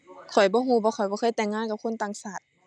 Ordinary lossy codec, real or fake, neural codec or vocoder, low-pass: none; real; none; 9.9 kHz